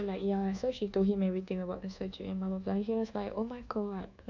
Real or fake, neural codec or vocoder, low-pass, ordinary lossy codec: fake; codec, 24 kHz, 1.2 kbps, DualCodec; 7.2 kHz; none